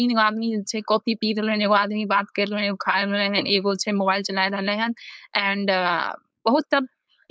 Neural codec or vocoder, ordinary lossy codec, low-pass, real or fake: codec, 16 kHz, 4.8 kbps, FACodec; none; none; fake